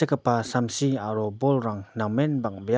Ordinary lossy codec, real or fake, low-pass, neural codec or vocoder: none; real; none; none